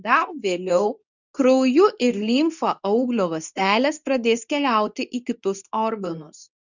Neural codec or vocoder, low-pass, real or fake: codec, 24 kHz, 0.9 kbps, WavTokenizer, medium speech release version 2; 7.2 kHz; fake